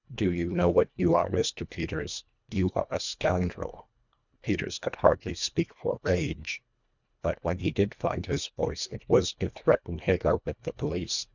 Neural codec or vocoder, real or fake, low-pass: codec, 24 kHz, 1.5 kbps, HILCodec; fake; 7.2 kHz